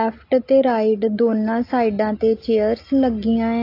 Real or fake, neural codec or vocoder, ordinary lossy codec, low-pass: real; none; AAC, 32 kbps; 5.4 kHz